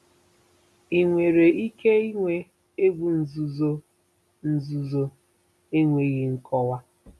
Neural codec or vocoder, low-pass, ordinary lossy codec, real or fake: none; none; none; real